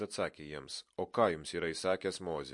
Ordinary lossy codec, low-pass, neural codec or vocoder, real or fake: MP3, 48 kbps; 10.8 kHz; none; real